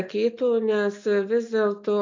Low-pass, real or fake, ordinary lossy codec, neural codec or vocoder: 7.2 kHz; real; AAC, 48 kbps; none